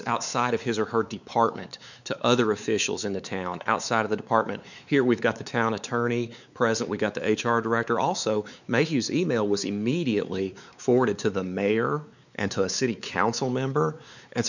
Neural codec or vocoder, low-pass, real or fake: codec, 16 kHz, 4 kbps, X-Codec, WavLM features, trained on Multilingual LibriSpeech; 7.2 kHz; fake